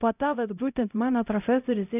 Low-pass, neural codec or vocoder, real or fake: 3.6 kHz; codec, 16 kHz, 0.5 kbps, X-Codec, HuBERT features, trained on LibriSpeech; fake